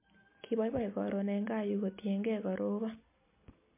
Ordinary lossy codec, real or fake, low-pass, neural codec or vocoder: MP3, 24 kbps; real; 3.6 kHz; none